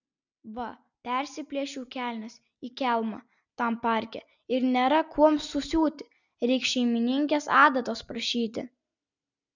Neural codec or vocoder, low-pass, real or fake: none; 7.2 kHz; real